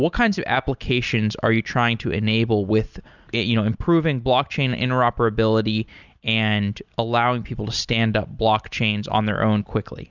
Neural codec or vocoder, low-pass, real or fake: none; 7.2 kHz; real